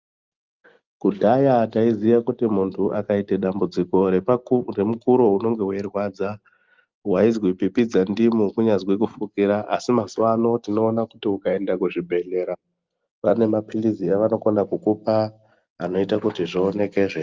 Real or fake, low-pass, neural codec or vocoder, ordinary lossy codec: real; 7.2 kHz; none; Opus, 32 kbps